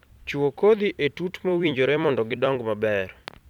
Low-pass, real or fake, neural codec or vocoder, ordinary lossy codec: 19.8 kHz; fake; vocoder, 44.1 kHz, 128 mel bands every 256 samples, BigVGAN v2; none